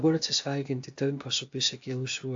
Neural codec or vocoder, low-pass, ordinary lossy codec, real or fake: codec, 16 kHz, 0.8 kbps, ZipCodec; 7.2 kHz; AAC, 64 kbps; fake